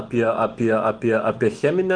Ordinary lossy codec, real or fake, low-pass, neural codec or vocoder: Opus, 24 kbps; fake; 9.9 kHz; autoencoder, 48 kHz, 128 numbers a frame, DAC-VAE, trained on Japanese speech